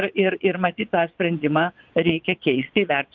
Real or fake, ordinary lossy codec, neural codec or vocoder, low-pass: fake; Opus, 24 kbps; vocoder, 22.05 kHz, 80 mel bands, Vocos; 7.2 kHz